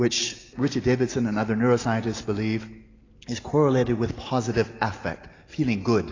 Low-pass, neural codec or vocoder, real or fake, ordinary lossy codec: 7.2 kHz; none; real; AAC, 32 kbps